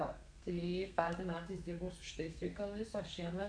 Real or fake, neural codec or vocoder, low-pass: fake; codec, 32 kHz, 1.9 kbps, SNAC; 9.9 kHz